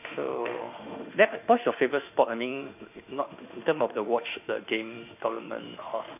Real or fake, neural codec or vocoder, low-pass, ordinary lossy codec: fake; autoencoder, 48 kHz, 32 numbers a frame, DAC-VAE, trained on Japanese speech; 3.6 kHz; none